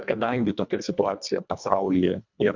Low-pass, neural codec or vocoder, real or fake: 7.2 kHz; codec, 24 kHz, 1.5 kbps, HILCodec; fake